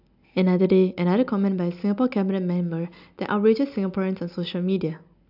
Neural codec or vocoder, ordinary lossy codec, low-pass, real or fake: none; none; 5.4 kHz; real